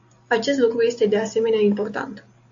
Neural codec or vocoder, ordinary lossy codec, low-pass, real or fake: none; AAC, 48 kbps; 7.2 kHz; real